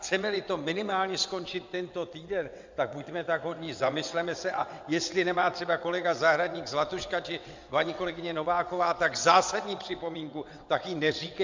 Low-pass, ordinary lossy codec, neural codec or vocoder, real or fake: 7.2 kHz; MP3, 64 kbps; vocoder, 22.05 kHz, 80 mel bands, WaveNeXt; fake